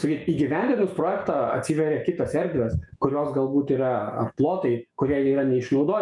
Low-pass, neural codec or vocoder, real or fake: 10.8 kHz; autoencoder, 48 kHz, 128 numbers a frame, DAC-VAE, trained on Japanese speech; fake